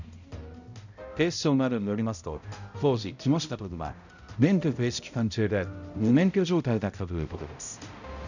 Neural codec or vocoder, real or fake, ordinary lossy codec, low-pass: codec, 16 kHz, 0.5 kbps, X-Codec, HuBERT features, trained on balanced general audio; fake; none; 7.2 kHz